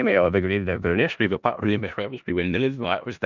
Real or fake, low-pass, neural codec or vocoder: fake; 7.2 kHz; codec, 16 kHz in and 24 kHz out, 0.4 kbps, LongCat-Audio-Codec, four codebook decoder